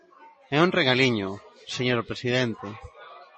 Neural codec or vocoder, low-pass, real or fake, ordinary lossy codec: none; 10.8 kHz; real; MP3, 32 kbps